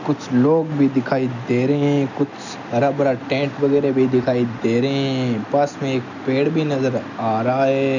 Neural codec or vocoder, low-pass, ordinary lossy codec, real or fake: none; 7.2 kHz; none; real